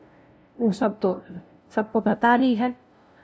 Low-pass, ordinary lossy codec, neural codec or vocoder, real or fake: none; none; codec, 16 kHz, 0.5 kbps, FunCodec, trained on LibriTTS, 25 frames a second; fake